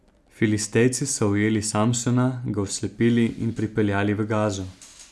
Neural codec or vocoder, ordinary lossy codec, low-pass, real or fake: none; none; none; real